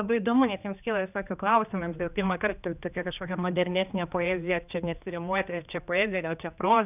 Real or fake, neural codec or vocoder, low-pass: fake; codec, 16 kHz, 2 kbps, X-Codec, HuBERT features, trained on general audio; 3.6 kHz